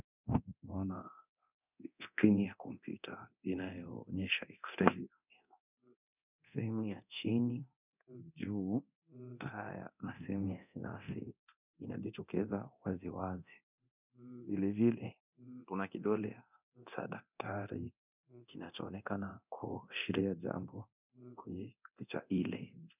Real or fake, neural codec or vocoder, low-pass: fake; codec, 24 kHz, 0.9 kbps, DualCodec; 3.6 kHz